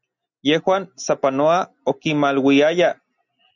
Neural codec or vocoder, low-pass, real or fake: none; 7.2 kHz; real